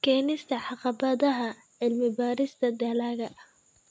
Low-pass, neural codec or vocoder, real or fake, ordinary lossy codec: none; none; real; none